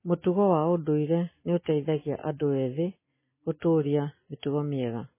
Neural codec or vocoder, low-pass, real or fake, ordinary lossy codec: none; 3.6 kHz; real; MP3, 16 kbps